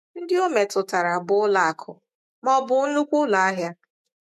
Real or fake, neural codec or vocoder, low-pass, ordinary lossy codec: fake; autoencoder, 48 kHz, 128 numbers a frame, DAC-VAE, trained on Japanese speech; 14.4 kHz; MP3, 64 kbps